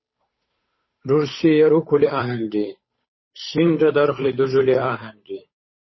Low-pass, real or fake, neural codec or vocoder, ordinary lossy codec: 7.2 kHz; fake; codec, 16 kHz, 8 kbps, FunCodec, trained on Chinese and English, 25 frames a second; MP3, 24 kbps